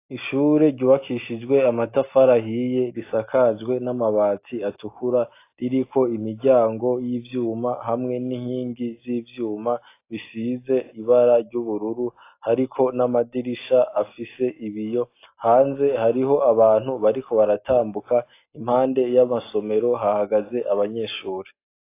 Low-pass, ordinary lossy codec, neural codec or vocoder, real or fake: 3.6 kHz; AAC, 24 kbps; none; real